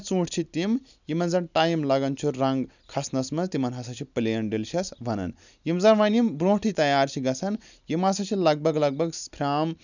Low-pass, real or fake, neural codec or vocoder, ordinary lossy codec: 7.2 kHz; real; none; none